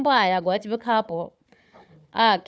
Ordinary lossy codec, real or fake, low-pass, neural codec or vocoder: none; fake; none; codec, 16 kHz, 4 kbps, FunCodec, trained on Chinese and English, 50 frames a second